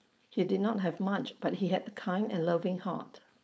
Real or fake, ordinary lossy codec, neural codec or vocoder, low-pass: fake; none; codec, 16 kHz, 4.8 kbps, FACodec; none